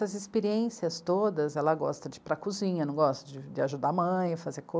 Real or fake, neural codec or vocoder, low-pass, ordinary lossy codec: real; none; none; none